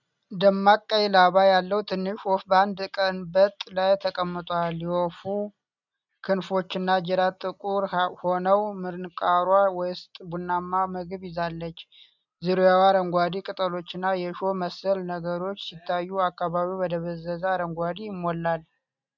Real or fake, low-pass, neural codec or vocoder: real; 7.2 kHz; none